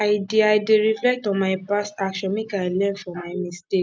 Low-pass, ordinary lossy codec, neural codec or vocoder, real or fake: none; none; none; real